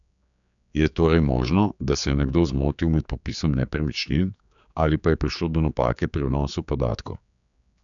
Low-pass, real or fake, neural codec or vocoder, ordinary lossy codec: 7.2 kHz; fake; codec, 16 kHz, 4 kbps, X-Codec, HuBERT features, trained on general audio; none